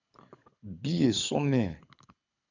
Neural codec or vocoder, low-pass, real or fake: codec, 24 kHz, 6 kbps, HILCodec; 7.2 kHz; fake